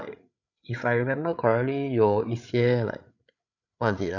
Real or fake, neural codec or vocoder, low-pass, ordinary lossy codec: fake; codec, 16 kHz, 8 kbps, FreqCodec, larger model; 7.2 kHz; none